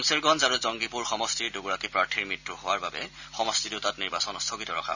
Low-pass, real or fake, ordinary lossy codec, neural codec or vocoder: 7.2 kHz; real; none; none